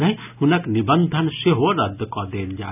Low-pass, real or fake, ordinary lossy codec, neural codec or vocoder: 3.6 kHz; real; none; none